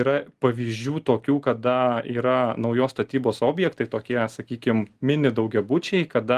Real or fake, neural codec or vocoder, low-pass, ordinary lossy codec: real; none; 14.4 kHz; Opus, 24 kbps